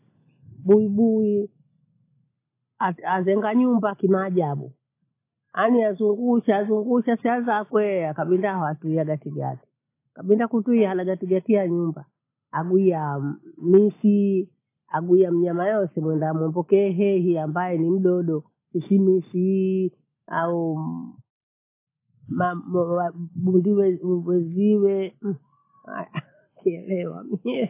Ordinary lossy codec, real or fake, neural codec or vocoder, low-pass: AAC, 24 kbps; real; none; 3.6 kHz